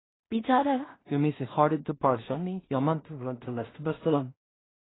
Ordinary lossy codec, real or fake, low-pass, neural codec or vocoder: AAC, 16 kbps; fake; 7.2 kHz; codec, 16 kHz in and 24 kHz out, 0.4 kbps, LongCat-Audio-Codec, two codebook decoder